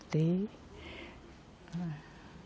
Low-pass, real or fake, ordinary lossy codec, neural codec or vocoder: none; real; none; none